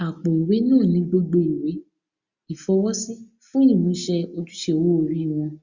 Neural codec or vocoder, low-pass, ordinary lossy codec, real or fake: none; 7.2 kHz; Opus, 64 kbps; real